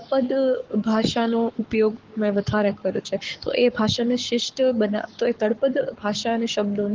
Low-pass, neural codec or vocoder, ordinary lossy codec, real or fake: 7.2 kHz; codec, 44.1 kHz, 7.8 kbps, Pupu-Codec; Opus, 16 kbps; fake